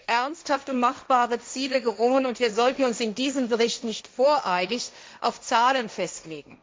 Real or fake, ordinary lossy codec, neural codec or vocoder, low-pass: fake; none; codec, 16 kHz, 1.1 kbps, Voila-Tokenizer; none